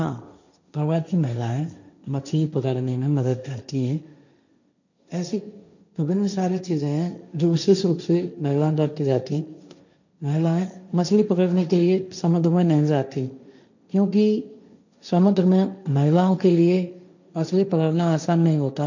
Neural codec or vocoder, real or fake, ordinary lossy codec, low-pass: codec, 16 kHz, 1.1 kbps, Voila-Tokenizer; fake; none; 7.2 kHz